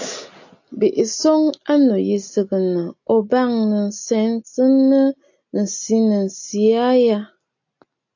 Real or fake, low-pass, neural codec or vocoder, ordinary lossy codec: real; 7.2 kHz; none; AAC, 48 kbps